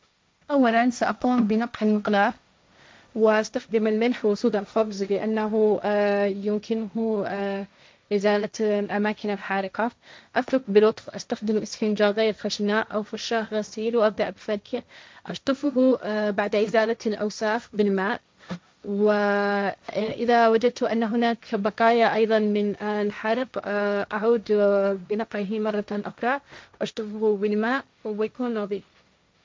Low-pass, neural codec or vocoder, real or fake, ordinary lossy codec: none; codec, 16 kHz, 1.1 kbps, Voila-Tokenizer; fake; none